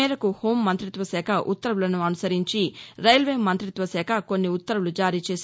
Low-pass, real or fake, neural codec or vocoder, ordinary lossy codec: none; real; none; none